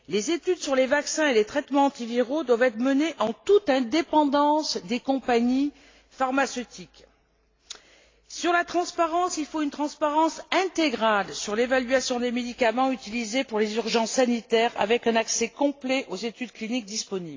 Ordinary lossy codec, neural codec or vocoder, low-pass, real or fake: AAC, 32 kbps; none; 7.2 kHz; real